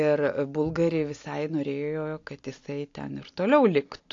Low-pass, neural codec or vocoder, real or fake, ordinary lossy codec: 7.2 kHz; none; real; AAC, 48 kbps